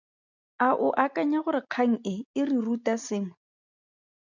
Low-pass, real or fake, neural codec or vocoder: 7.2 kHz; real; none